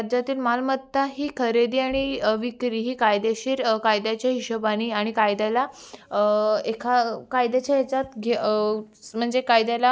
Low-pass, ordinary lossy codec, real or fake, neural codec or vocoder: none; none; real; none